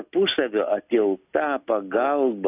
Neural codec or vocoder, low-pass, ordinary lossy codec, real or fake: none; 3.6 kHz; AAC, 24 kbps; real